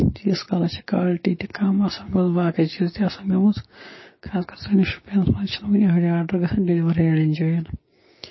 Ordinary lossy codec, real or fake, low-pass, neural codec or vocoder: MP3, 24 kbps; fake; 7.2 kHz; codec, 16 kHz, 6 kbps, DAC